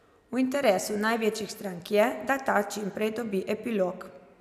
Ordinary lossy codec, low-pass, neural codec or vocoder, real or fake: none; 14.4 kHz; none; real